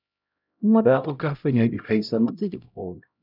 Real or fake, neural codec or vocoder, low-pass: fake; codec, 16 kHz, 0.5 kbps, X-Codec, HuBERT features, trained on LibriSpeech; 5.4 kHz